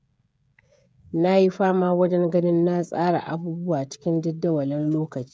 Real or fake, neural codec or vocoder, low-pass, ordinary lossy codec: fake; codec, 16 kHz, 16 kbps, FreqCodec, smaller model; none; none